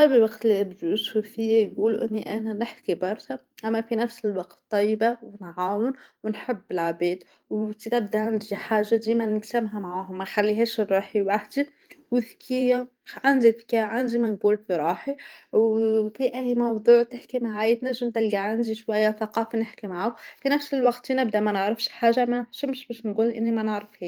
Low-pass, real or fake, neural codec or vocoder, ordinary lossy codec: 19.8 kHz; fake; vocoder, 44.1 kHz, 128 mel bands every 512 samples, BigVGAN v2; Opus, 32 kbps